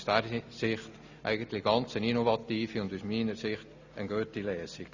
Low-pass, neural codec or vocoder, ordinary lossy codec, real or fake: 7.2 kHz; none; Opus, 64 kbps; real